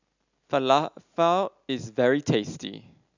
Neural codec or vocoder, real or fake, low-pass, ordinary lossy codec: none; real; 7.2 kHz; none